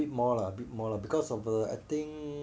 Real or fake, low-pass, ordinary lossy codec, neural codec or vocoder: real; none; none; none